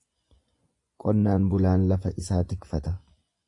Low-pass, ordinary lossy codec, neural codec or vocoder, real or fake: 10.8 kHz; AAC, 48 kbps; vocoder, 24 kHz, 100 mel bands, Vocos; fake